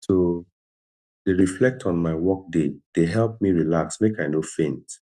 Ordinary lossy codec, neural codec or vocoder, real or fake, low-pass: none; none; real; none